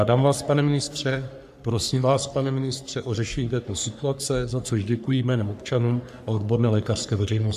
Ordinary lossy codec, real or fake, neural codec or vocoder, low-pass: AAC, 96 kbps; fake; codec, 44.1 kHz, 3.4 kbps, Pupu-Codec; 14.4 kHz